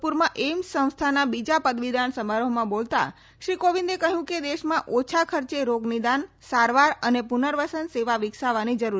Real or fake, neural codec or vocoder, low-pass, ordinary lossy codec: real; none; none; none